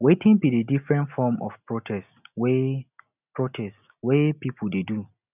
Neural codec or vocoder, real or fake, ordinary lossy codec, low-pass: none; real; none; 3.6 kHz